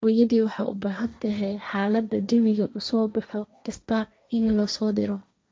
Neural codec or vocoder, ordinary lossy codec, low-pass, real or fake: codec, 16 kHz, 1.1 kbps, Voila-Tokenizer; none; none; fake